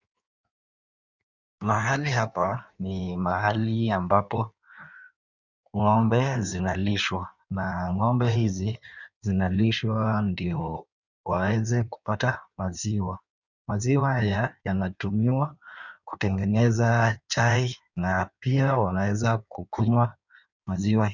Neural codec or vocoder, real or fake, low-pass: codec, 16 kHz in and 24 kHz out, 1.1 kbps, FireRedTTS-2 codec; fake; 7.2 kHz